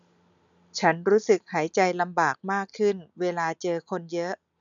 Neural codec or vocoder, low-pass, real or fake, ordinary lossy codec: none; 7.2 kHz; real; none